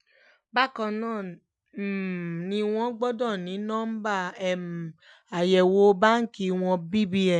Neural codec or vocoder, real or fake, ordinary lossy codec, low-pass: none; real; none; 10.8 kHz